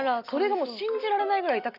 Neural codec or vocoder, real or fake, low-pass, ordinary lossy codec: none; real; 5.4 kHz; none